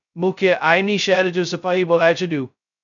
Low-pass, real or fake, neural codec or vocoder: 7.2 kHz; fake; codec, 16 kHz, 0.2 kbps, FocalCodec